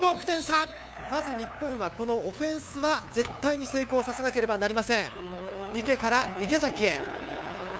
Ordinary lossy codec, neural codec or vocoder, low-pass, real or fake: none; codec, 16 kHz, 2 kbps, FunCodec, trained on LibriTTS, 25 frames a second; none; fake